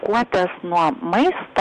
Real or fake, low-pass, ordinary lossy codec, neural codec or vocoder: real; 9.9 kHz; MP3, 64 kbps; none